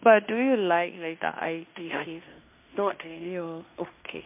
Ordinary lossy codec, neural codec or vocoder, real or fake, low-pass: MP3, 32 kbps; codec, 16 kHz in and 24 kHz out, 0.9 kbps, LongCat-Audio-Codec, fine tuned four codebook decoder; fake; 3.6 kHz